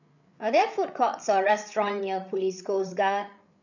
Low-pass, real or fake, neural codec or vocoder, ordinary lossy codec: 7.2 kHz; fake; codec, 16 kHz, 8 kbps, FreqCodec, larger model; none